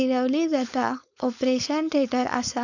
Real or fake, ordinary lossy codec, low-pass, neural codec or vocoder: fake; none; 7.2 kHz; codec, 16 kHz, 4.8 kbps, FACodec